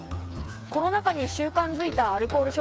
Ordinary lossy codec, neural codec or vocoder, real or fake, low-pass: none; codec, 16 kHz, 4 kbps, FreqCodec, smaller model; fake; none